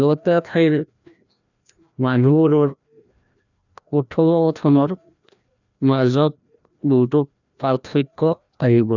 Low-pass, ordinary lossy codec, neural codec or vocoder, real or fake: 7.2 kHz; none; codec, 16 kHz, 1 kbps, FreqCodec, larger model; fake